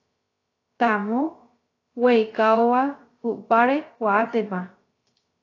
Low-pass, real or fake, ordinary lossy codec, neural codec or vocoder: 7.2 kHz; fake; AAC, 32 kbps; codec, 16 kHz, 0.3 kbps, FocalCodec